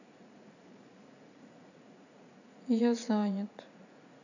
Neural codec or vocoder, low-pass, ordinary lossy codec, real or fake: vocoder, 44.1 kHz, 80 mel bands, Vocos; 7.2 kHz; none; fake